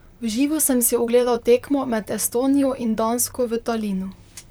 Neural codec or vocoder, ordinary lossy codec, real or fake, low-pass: vocoder, 44.1 kHz, 128 mel bands, Pupu-Vocoder; none; fake; none